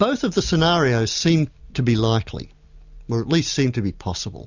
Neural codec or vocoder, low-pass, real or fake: none; 7.2 kHz; real